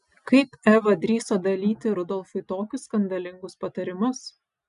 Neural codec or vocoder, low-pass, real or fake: none; 10.8 kHz; real